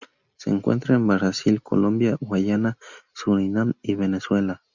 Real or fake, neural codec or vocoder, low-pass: real; none; 7.2 kHz